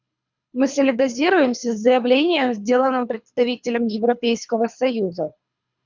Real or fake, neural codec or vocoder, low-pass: fake; codec, 24 kHz, 3 kbps, HILCodec; 7.2 kHz